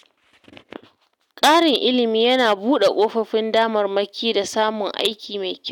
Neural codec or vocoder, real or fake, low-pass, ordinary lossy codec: none; real; 19.8 kHz; none